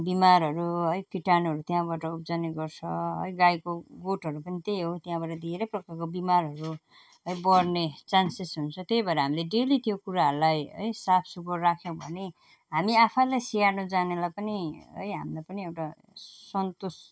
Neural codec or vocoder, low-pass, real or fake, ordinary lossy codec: none; none; real; none